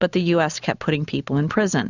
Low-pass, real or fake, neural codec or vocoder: 7.2 kHz; real; none